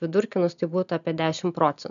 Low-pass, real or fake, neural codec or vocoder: 7.2 kHz; real; none